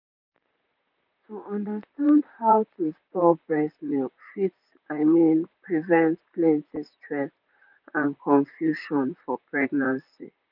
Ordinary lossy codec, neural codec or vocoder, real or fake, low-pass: none; vocoder, 44.1 kHz, 128 mel bands, Pupu-Vocoder; fake; 5.4 kHz